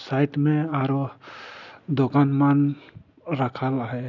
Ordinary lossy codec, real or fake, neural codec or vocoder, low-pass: none; real; none; 7.2 kHz